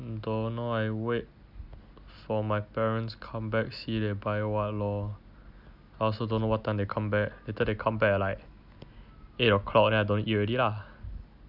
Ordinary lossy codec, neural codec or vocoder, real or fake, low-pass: none; none; real; 5.4 kHz